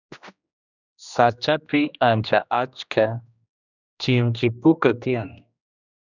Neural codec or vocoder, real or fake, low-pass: codec, 16 kHz, 1 kbps, X-Codec, HuBERT features, trained on general audio; fake; 7.2 kHz